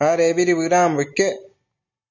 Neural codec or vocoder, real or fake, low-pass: none; real; 7.2 kHz